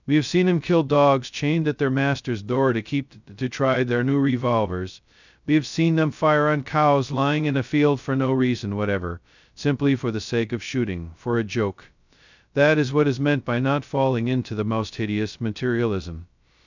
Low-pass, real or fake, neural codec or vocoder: 7.2 kHz; fake; codec, 16 kHz, 0.2 kbps, FocalCodec